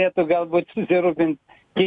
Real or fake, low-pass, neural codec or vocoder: real; 10.8 kHz; none